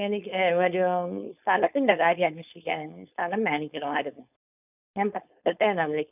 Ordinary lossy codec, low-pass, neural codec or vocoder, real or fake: none; 3.6 kHz; codec, 16 kHz, 4.8 kbps, FACodec; fake